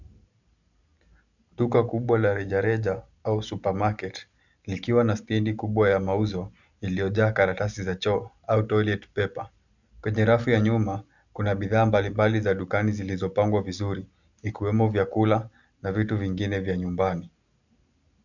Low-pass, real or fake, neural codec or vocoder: 7.2 kHz; real; none